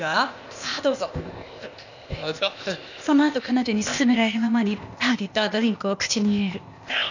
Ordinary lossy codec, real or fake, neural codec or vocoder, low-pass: none; fake; codec, 16 kHz, 0.8 kbps, ZipCodec; 7.2 kHz